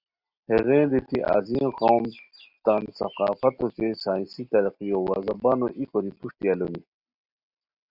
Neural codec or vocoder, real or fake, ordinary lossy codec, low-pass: none; real; Opus, 64 kbps; 5.4 kHz